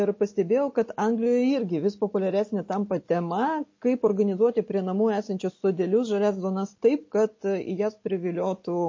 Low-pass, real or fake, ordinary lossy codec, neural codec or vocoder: 7.2 kHz; real; MP3, 32 kbps; none